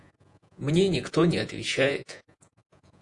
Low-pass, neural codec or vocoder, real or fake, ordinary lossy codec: 10.8 kHz; vocoder, 48 kHz, 128 mel bands, Vocos; fake; AAC, 64 kbps